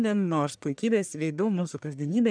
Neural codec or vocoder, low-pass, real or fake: codec, 44.1 kHz, 1.7 kbps, Pupu-Codec; 9.9 kHz; fake